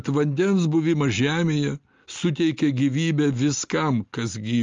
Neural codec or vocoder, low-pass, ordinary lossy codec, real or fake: none; 7.2 kHz; Opus, 32 kbps; real